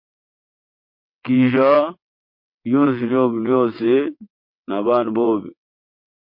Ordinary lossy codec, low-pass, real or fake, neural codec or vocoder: MP3, 32 kbps; 5.4 kHz; fake; vocoder, 22.05 kHz, 80 mel bands, WaveNeXt